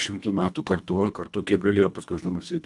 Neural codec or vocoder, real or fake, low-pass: codec, 24 kHz, 1.5 kbps, HILCodec; fake; 10.8 kHz